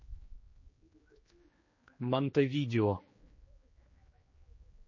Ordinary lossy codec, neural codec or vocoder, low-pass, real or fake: MP3, 32 kbps; codec, 16 kHz, 2 kbps, X-Codec, HuBERT features, trained on general audio; 7.2 kHz; fake